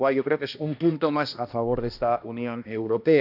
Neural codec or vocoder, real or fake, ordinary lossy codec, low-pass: codec, 16 kHz, 1 kbps, X-Codec, HuBERT features, trained on balanced general audio; fake; none; 5.4 kHz